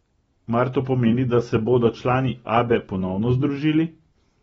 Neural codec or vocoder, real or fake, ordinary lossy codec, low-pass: none; real; AAC, 24 kbps; 19.8 kHz